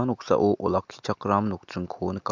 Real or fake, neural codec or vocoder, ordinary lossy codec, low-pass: real; none; MP3, 64 kbps; 7.2 kHz